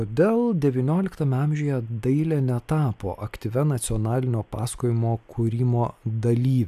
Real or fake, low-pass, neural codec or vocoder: real; 14.4 kHz; none